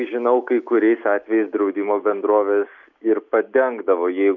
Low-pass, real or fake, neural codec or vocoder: 7.2 kHz; real; none